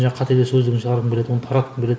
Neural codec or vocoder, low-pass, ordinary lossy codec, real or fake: none; none; none; real